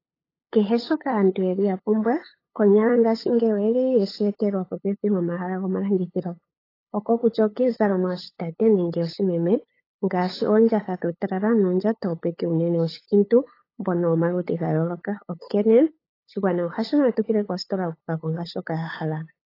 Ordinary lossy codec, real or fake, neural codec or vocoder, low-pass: AAC, 24 kbps; fake; codec, 16 kHz, 8 kbps, FunCodec, trained on LibriTTS, 25 frames a second; 5.4 kHz